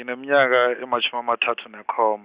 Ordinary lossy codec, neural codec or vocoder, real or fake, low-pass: Opus, 64 kbps; none; real; 3.6 kHz